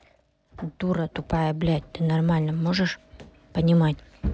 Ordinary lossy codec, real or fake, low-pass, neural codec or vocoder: none; real; none; none